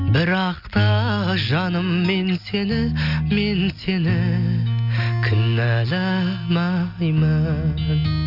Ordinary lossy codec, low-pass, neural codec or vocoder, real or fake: none; 5.4 kHz; none; real